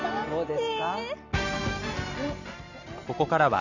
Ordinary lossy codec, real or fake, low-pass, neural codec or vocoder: none; real; 7.2 kHz; none